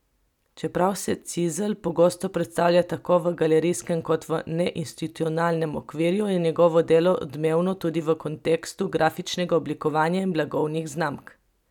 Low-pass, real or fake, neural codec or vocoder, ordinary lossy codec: 19.8 kHz; real; none; none